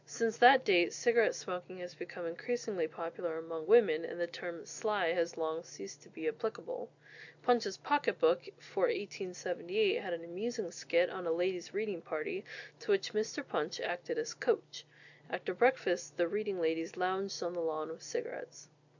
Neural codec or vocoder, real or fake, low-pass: none; real; 7.2 kHz